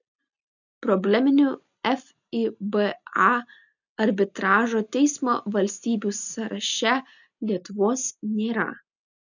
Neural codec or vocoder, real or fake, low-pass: none; real; 7.2 kHz